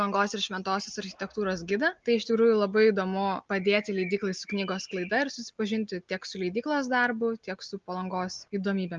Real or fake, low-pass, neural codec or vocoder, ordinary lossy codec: real; 7.2 kHz; none; Opus, 32 kbps